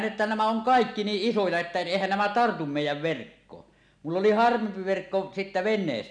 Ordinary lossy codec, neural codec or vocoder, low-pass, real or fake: none; none; 9.9 kHz; real